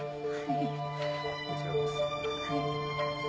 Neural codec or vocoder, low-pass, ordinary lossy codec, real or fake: none; none; none; real